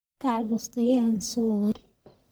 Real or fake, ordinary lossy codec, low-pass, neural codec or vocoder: fake; none; none; codec, 44.1 kHz, 1.7 kbps, Pupu-Codec